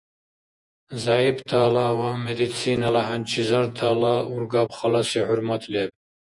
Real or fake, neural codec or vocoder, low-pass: fake; vocoder, 48 kHz, 128 mel bands, Vocos; 10.8 kHz